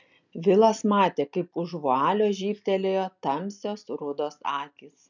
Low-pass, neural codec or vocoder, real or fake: 7.2 kHz; none; real